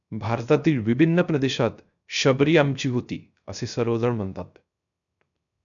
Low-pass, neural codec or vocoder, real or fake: 7.2 kHz; codec, 16 kHz, 0.3 kbps, FocalCodec; fake